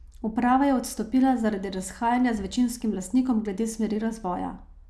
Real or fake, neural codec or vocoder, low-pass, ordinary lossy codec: real; none; none; none